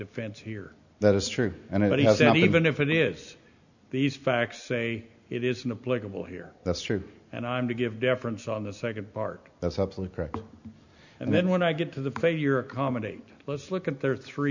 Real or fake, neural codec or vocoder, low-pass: real; none; 7.2 kHz